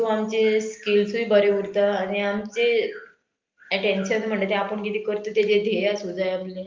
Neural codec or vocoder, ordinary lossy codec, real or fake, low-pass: none; Opus, 32 kbps; real; 7.2 kHz